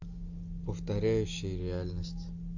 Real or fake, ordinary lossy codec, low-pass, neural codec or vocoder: real; AAC, 48 kbps; 7.2 kHz; none